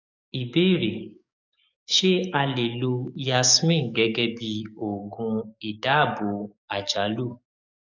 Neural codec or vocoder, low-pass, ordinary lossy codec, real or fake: codec, 44.1 kHz, 7.8 kbps, DAC; 7.2 kHz; none; fake